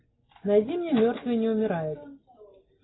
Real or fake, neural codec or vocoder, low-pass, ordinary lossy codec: real; none; 7.2 kHz; AAC, 16 kbps